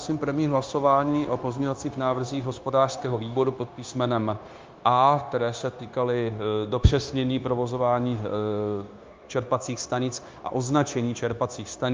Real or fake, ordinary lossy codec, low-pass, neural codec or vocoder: fake; Opus, 24 kbps; 7.2 kHz; codec, 16 kHz, 0.9 kbps, LongCat-Audio-Codec